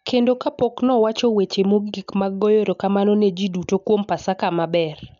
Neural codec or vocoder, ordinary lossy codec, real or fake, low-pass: none; none; real; 7.2 kHz